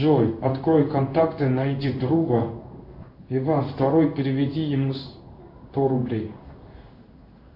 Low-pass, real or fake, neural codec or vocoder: 5.4 kHz; fake; codec, 16 kHz in and 24 kHz out, 1 kbps, XY-Tokenizer